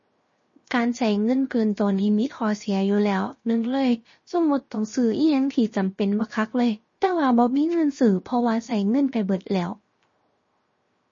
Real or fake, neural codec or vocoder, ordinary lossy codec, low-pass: fake; codec, 16 kHz, 0.7 kbps, FocalCodec; MP3, 32 kbps; 7.2 kHz